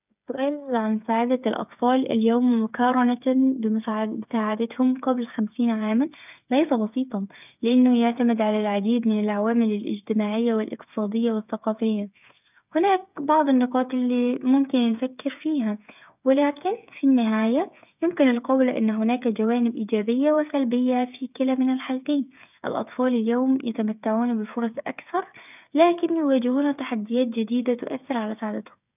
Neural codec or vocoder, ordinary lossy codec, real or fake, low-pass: codec, 16 kHz, 8 kbps, FreqCodec, smaller model; none; fake; 3.6 kHz